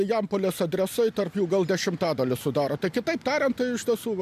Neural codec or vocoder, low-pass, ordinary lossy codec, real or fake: none; 14.4 kHz; MP3, 96 kbps; real